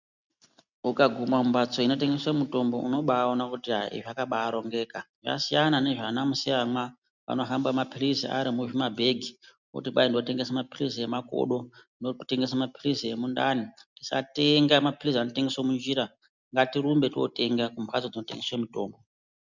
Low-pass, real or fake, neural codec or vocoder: 7.2 kHz; real; none